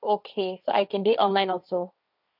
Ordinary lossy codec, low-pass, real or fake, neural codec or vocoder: none; 5.4 kHz; fake; codec, 16 kHz, 1.1 kbps, Voila-Tokenizer